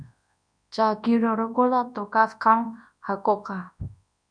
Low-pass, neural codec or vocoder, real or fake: 9.9 kHz; codec, 24 kHz, 0.9 kbps, WavTokenizer, large speech release; fake